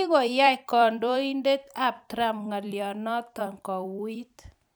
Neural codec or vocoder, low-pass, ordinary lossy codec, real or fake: vocoder, 44.1 kHz, 128 mel bands every 256 samples, BigVGAN v2; none; none; fake